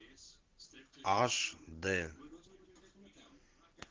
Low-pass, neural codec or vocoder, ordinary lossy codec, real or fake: 7.2 kHz; none; Opus, 16 kbps; real